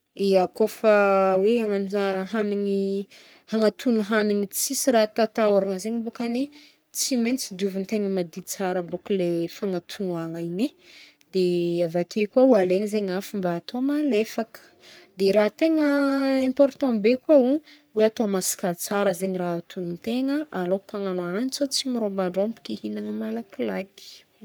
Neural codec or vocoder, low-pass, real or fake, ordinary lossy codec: codec, 44.1 kHz, 3.4 kbps, Pupu-Codec; none; fake; none